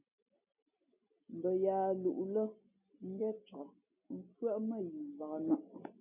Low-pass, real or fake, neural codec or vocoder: 3.6 kHz; real; none